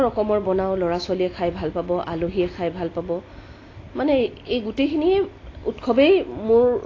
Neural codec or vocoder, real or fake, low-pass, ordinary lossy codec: none; real; 7.2 kHz; AAC, 32 kbps